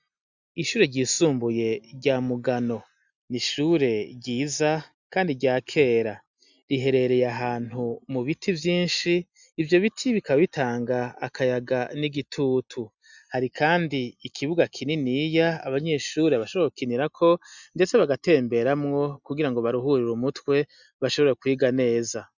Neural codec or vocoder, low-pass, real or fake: none; 7.2 kHz; real